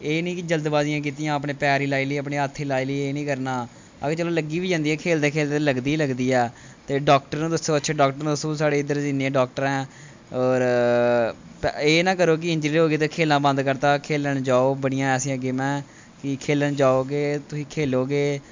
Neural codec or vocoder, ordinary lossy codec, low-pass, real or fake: none; MP3, 64 kbps; 7.2 kHz; real